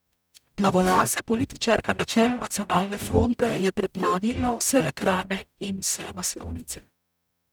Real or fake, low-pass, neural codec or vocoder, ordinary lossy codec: fake; none; codec, 44.1 kHz, 0.9 kbps, DAC; none